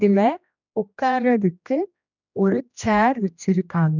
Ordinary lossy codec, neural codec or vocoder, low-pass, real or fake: none; codec, 16 kHz, 1 kbps, X-Codec, HuBERT features, trained on general audio; 7.2 kHz; fake